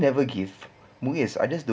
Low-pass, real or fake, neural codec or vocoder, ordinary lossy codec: none; real; none; none